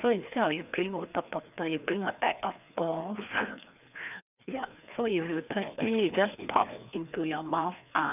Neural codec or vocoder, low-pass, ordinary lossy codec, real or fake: codec, 16 kHz, 2 kbps, FreqCodec, larger model; 3.6 kHz; none; fake